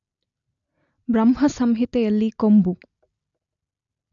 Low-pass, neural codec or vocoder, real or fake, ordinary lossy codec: 7.2 kHz; none; real; none